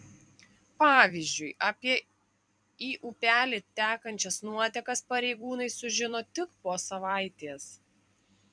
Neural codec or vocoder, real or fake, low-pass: vocoder, 44.1 kHz, 128 mel bands every 256 samples, BigVGAN v2; fake; 9.9 kHz